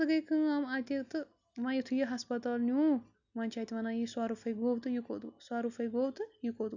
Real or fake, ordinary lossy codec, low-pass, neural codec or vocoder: real; none; 7.2 kHz; none